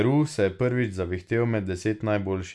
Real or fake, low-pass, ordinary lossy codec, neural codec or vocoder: real; none; none; none